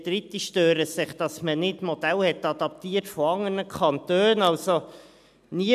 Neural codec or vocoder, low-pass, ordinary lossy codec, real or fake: none; 14.4 kHz; none; real